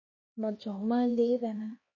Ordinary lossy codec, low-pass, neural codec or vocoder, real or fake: MP3, 32 kbps; 7.2 kHz; codec, 16 kHz, 1 kbps, X-Codec, HuBERT features, trained on LibriSpeech; fake